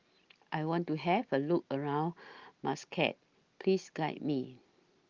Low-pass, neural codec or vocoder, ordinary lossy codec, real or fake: 7.2 kHz; none; Opus, 32 kbps; real